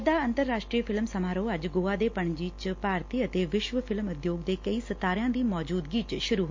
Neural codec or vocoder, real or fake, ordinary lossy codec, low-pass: none; real; MP3, 48 kbps; 7.2 kHz